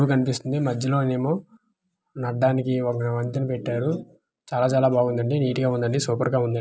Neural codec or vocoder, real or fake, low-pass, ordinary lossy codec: none; real; none; none